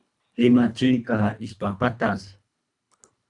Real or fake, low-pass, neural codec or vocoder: fake; 10.8 kHz; codec, 24 kHz, 1.5 kbps, HILCodec